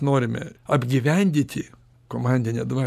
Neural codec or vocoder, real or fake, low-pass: none; real; 14.4 kHz